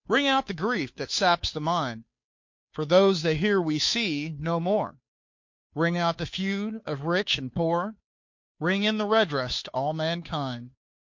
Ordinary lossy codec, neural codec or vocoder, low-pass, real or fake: MP3, 48 kbps; codec, 16 kHz, 2 kbps, FunCodec, trained on Chinese and English, 25 frames a second; 7.2 kHz; fake